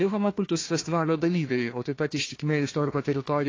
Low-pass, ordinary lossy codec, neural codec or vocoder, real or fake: 7.2 kHz; AAC, 32 kbps; codec, 16 kHz, 1 kbps, FunCodec, trained on Chinese and English, 50 frames a second; fake